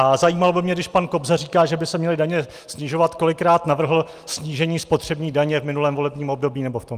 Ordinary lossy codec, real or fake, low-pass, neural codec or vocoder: Opus, 32 kbps; real; 14.4 kHz; none